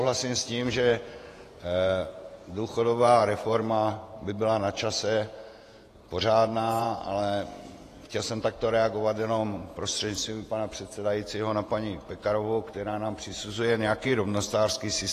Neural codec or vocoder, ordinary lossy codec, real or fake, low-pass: vocoder, 48 kHz, 128 mel bands, Vocos; AAC, 48 kbps; fake; 14.4 kHz